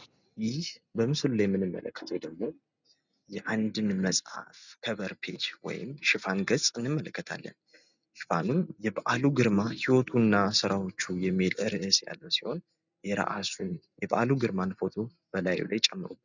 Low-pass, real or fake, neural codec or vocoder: 7.2 kHz; real; none